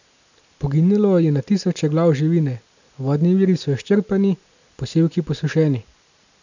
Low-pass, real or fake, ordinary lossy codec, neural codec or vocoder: 7.2 kHz; real; none; none